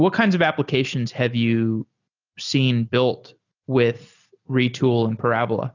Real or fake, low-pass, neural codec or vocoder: real; 7.2 kHz; none